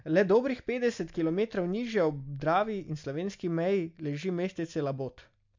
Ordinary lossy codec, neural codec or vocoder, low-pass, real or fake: MP3, 64 kbps; none; 7.2 kHz; real